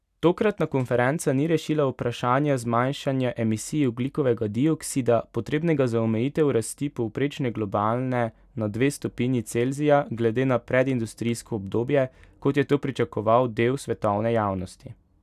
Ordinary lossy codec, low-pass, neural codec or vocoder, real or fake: none; 14.4 kHz; none; real